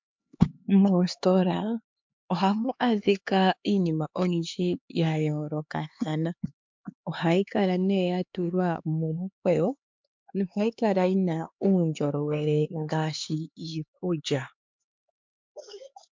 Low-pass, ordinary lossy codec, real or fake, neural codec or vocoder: 7.2 kHz; MP3, 64 kbps; fake; codec, 16 kHz, 4 kbps, X-Codec, HuBERT features, trained on LibriSpeech